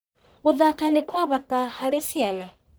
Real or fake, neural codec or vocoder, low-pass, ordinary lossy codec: fake; codec, 44.1 kHz, 1.7 kbps, Pupu-Codec; none; none